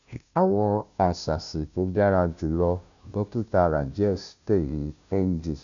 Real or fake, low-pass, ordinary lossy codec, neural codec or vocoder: fake; 7.2 kHz; none; codec, 16 kHz, 0.5 kbps, FunCodec, trained on Chinese and English, 25 frames a second